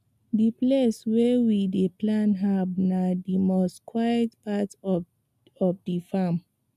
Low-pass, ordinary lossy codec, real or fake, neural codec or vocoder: 14.4 kHz; none; real; none